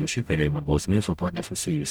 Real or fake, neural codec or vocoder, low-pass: fake; codec, 44.1 kHz, 0.9 kbps, DAC; 19.8 kHz